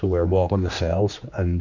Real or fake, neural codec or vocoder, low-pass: fake; codec, 16 kHz, 2 kbps, X-Codec, HuBERT features, trained on general audio; 7.2 kHz